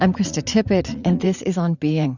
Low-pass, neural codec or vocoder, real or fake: 7.2 kHz; vocoder, 44.1 kHz, 80 mel bands, Vocos; fake